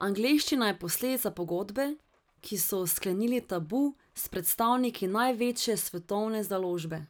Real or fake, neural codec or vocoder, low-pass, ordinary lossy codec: real; none; none; none